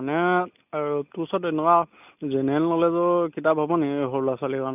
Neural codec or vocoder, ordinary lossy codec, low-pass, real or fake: none; none; 3.6 kHz; real